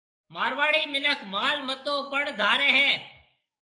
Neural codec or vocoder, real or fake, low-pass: codec, 44.1 kHz, 7.8 kbps, DAC; fake; 9.9 kHz